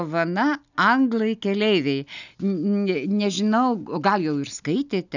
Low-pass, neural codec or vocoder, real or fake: 7.2 kHz; none; real